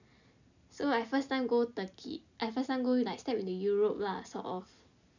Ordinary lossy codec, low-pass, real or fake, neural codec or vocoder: none; 7.2 kHz; real; none